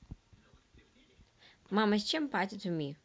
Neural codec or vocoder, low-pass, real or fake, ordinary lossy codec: none; none; real; none